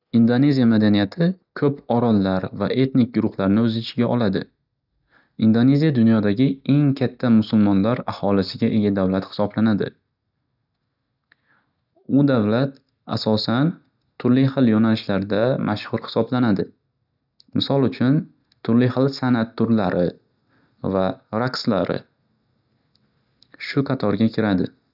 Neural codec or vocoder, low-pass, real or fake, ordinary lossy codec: none; 5.4 kHz; real; none